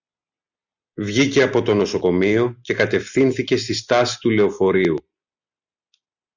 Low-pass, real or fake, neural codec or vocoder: 7.2 kHz; real; none